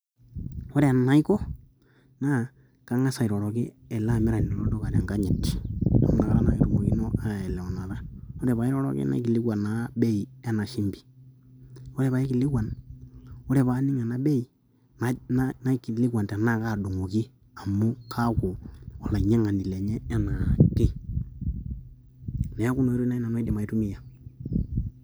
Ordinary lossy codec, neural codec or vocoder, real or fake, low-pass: none; none; real; none